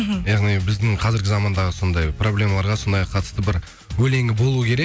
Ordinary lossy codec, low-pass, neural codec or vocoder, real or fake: none; none; none; real